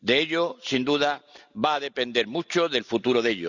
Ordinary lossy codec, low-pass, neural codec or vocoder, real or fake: none; 7.2 kHz; none; real